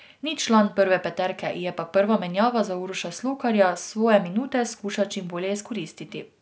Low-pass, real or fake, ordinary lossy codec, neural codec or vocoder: none; real; none; none